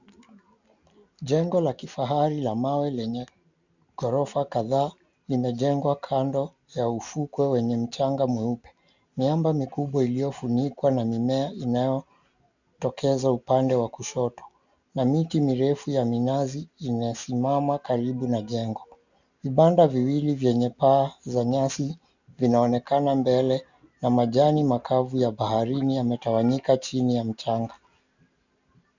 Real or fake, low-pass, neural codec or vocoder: real; 7.2 kHz; none